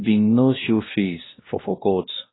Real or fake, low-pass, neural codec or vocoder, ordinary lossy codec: fake; 7.2 kHz; codec, 16 kHz, 1 kbps, X-Codec, WavLM features, trained on Multilingual LibriSpeech; AAC, 16 kbps